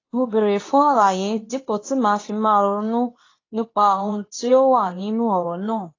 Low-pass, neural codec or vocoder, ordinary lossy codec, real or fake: 7.2 kHz; codec, 24 kHz, 0.9 kbps, WavTokenizer, medium speech release version 2; AAC, 32 kbps; fake